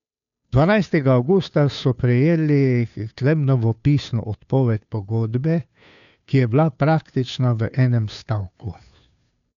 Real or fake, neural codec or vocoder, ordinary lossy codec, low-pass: fake; codec, 16 kHz, 2 kbps, FunCodec, trained on Chinese and English, 25 frames a second; none; 7.2 kHz